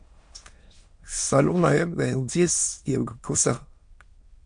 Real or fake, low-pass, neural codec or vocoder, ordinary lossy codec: fake; 9.9 kHz; autoencoder, 22.05 kHz, a latent of 192 numbers a frame, VITS, trained on many speakers; MP3, 48 kbps